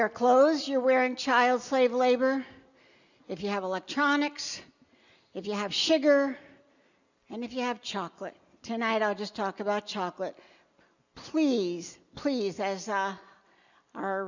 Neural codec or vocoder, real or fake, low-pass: none; real; 7.2 kHz